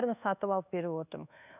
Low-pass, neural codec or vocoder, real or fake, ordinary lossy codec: 3.6 kHz; codec, 24 kHz, 1.2 kbps, DualCodec; fake; none